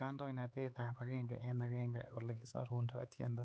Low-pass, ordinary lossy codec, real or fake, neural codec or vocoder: none; none; fake; codec, 16 kHz, 4 kbps, X-Codec, HuBERT features, trained on LibriSpeech